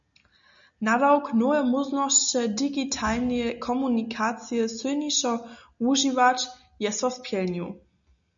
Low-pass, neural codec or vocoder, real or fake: 7.2 kHz; none; real